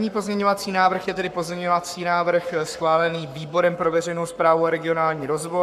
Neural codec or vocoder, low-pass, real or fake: codec, 44.1 kHz, 7.8 kbps, Pupu-Codec; 14.4 kHz; fake